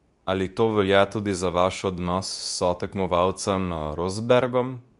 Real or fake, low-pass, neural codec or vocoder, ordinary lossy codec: fake; 10.8 kHz; codec, 24 kHz, 0.9 kbps, WavTokenizer, medium speech release version 2; none